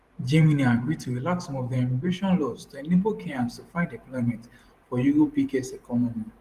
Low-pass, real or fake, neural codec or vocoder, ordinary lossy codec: 14.4 kHz; fake; vocoder, 44.1 kHz, 128 mel bands every 512 samples, BigVGAN v2; Opus, 24 kbps